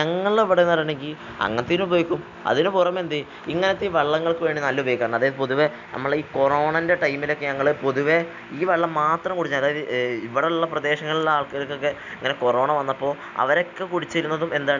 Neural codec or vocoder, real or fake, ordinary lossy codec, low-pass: none; real; none; 7.2 kHz